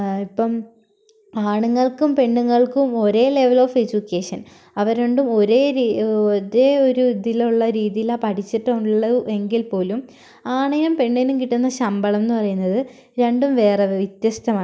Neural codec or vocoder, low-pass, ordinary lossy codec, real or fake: none; none; none; real